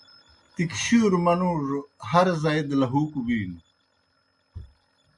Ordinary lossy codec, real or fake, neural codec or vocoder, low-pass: MP3, 64 kbps; real; none; 10.8 kHz